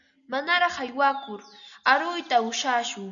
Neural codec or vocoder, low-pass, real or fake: none; 7.2 kHz; real